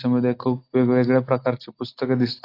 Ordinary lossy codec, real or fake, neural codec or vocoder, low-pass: AAC, 32 kbps; real; none; 5.4 kHz